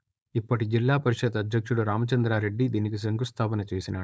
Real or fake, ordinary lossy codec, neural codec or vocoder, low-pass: fake; none; codec, 16 kHz, 4.8 kbps, FACodec; none